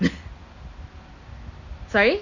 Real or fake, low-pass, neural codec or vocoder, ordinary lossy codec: real; 7.2 kHz; none; none